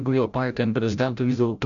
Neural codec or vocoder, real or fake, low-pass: codec, 16 kHz, 0.5 kbps, FreqCodec, larger model; fake; 7.2 kHz